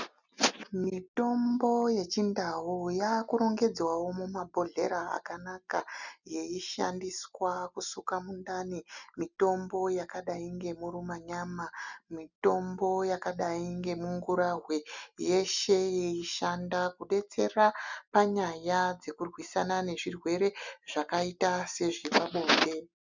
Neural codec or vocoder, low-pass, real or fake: none; 7.2 kHz; real